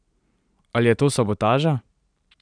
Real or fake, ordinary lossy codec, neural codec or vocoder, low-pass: fake; none; vocoder, 44.1 kHz, 128 mel bands every 512 samples, BigVGAN v2; 9.9 kHz